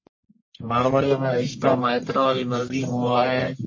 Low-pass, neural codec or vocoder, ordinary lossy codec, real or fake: 7.2 kHz; codec, 44.1 kHz, 1.7 kbps, Pupu-Codec; MP3, 32 kbps; fake